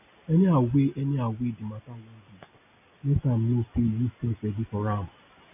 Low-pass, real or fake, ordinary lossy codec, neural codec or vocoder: 3.6 kHz; real; none; none